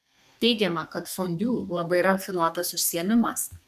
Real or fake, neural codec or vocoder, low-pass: fake; codec, 32 kHz, 1.9 kbps, SNAC; 14.4 kHz